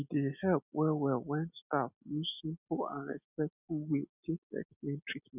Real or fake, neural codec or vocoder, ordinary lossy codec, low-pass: real; none; none; 3.6 kHz